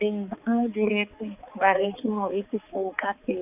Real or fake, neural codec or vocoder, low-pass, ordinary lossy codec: fake; codec, 16 kHz, 4 kbps, X-Codec, HuBERT features, trained on balanced general audio; 3.6 kHz; none